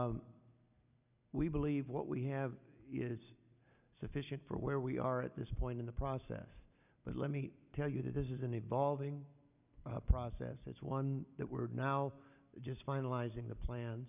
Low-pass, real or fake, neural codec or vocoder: 3.6 kHz; real; none